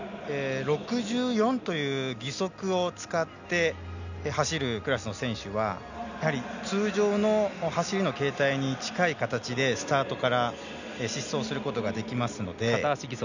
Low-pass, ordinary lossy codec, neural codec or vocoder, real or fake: 7.2 kHz; none; none; real